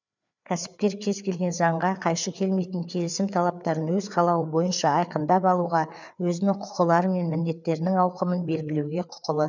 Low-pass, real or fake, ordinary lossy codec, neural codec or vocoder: 7.2 kHz; fake; none; codec, 16 kHz, 4 kbps, FreqCodec, larger model